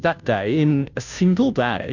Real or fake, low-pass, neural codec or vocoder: fake; 7.2 kHz; codec, 16 kHz, 0.5 kbps, FunCodec, trained on Chinese and English, 25 frames a second